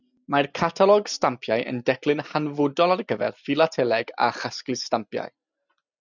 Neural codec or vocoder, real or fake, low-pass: none; real; 7.2 kHz